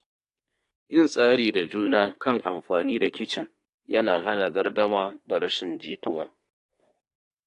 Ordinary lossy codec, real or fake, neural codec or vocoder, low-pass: AAC, 48 kbps; fake; codec, 24 kHz, 1 kbps, SNAC; 10.8 kHz